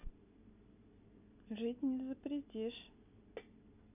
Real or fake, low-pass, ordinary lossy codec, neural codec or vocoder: real; 3.6 kHz; none; none